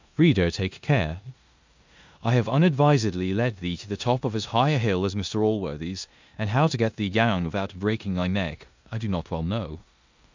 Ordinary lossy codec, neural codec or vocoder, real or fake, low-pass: MP3, 64 kbps; codec, 16 kHz in and 24 kHz out, 0.9 kbps, LongCat-Audio-Codec, four codebook decoder; fake; 7.2 kHz